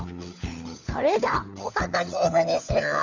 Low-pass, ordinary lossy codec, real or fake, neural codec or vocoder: 7.2 kHz; none; fake; codec, 16 kHz, 4 kbps, FunCodec, trained on LibriTTS, 50 frames a second